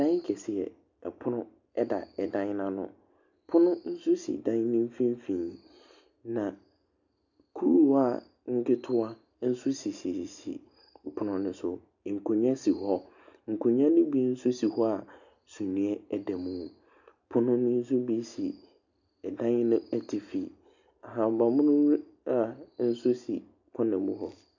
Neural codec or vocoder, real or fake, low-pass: none; real; 7.2 kHz